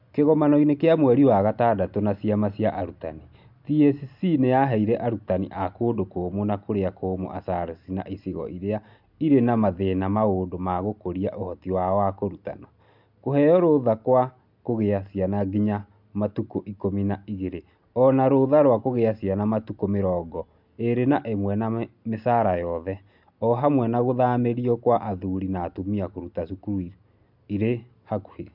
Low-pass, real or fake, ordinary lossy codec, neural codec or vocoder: 5.4 kHz; real; MP3, 48 kbps; none